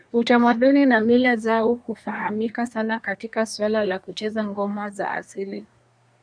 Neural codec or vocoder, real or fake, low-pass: codec, 24 kHz, 1 kbps, SNAC; fake; 9.9 kHz